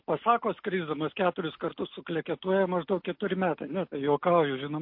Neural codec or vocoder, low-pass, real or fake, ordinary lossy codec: none; 5.4 kHz; real; MP3, 32 kbps